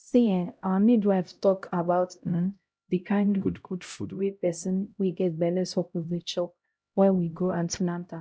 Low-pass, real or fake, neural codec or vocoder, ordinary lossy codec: none; fake; codec, 16 kHz, 0.5 kbps, X-Codec, HuBERT features, trained on LibriSpeech; none